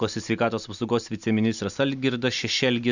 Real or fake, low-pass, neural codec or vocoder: real; 7.2 kHz; none